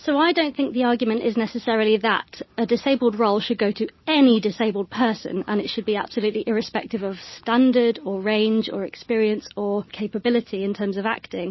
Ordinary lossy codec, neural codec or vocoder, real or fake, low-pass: MP3, 24 kbps; none; real; 7.2 kHz